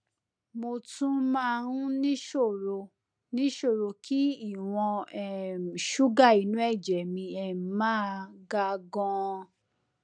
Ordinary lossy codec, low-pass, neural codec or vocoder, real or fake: none; 9.9 kHz; none; real